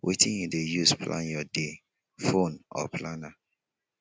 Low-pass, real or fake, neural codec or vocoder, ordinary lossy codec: none; real; none; none